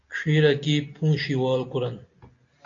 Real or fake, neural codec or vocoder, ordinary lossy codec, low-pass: real; none; AAC, 48 kbps; 7.2 kHz